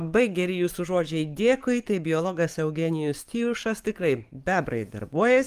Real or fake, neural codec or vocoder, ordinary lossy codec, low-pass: fake; codec, 44.1 kHz, 7.8 kbps, DAC; Opus, 32 kbps; 14.4 kHz